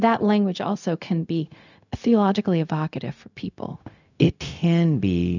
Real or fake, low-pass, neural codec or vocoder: fake; 7.2 kHz; codec, 16 kHz, 0.4 kbps, LongCat-Audio-Codec